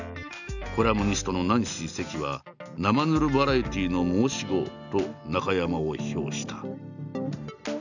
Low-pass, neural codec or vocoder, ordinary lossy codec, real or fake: 7.2 kHz; none; none; real